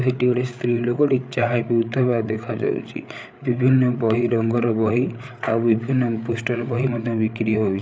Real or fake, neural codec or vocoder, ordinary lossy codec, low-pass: fake; codec, 16 kHz, 8 kbps, FreqCodec, larger model; none; none